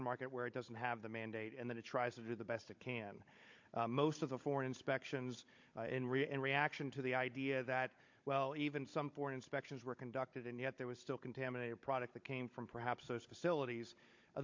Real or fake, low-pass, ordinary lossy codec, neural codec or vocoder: fake; 7.2 kHz; MP3, 48 kbps; codec, 16 kHz, 16 kbps, FunCodec, trained on Chinese and English, 50 frames a second